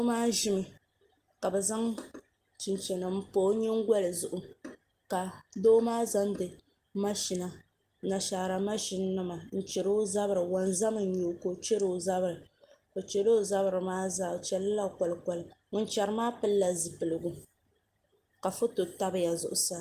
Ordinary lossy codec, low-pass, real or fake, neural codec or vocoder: Opus, 24 kbps; 14.4 kHz; real; none